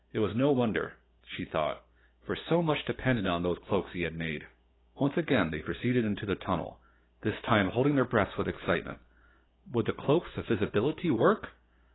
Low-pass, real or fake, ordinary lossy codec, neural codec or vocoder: 7.2 kHz; fake; AAC, 16 kbps; codec, 16 kHz, 6 kbps, DAC